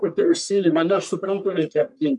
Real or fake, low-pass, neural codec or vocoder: fake; 10.8 kHz; codec, 44.1 kHz, 1.7 kbps, Pupu-Codec